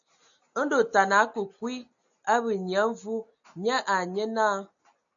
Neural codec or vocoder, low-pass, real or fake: none; 7.2 kHz; real